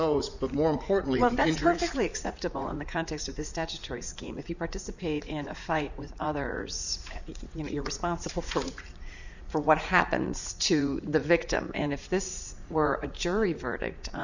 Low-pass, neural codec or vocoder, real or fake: 7.2 kHz; vocoder, 44.1 kHz, 80 mel bands, Vocos; fake